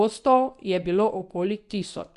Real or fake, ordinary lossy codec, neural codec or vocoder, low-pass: fake; Opus, 64 kbps; codec, 24 kHz, 0.9 kbps, WavTokenizer, medium speech release version 1; 10.8 kHz